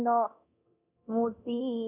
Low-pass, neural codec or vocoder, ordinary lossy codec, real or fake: 3.6 kHz; codec, 24 kHz, 0.9 kbps, DualCodec; none; fake